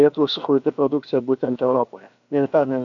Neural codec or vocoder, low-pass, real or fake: codec, 16 kHz, about 1 kbps, DyCAST, with the encoder's durations; 7.2 kHz; fake